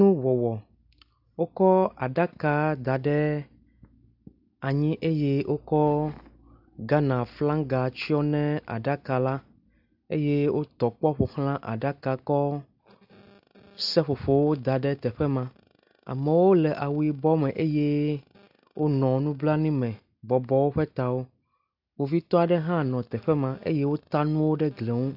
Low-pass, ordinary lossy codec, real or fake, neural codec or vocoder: 5.4 kHz; AAC, 48 kbps; real; none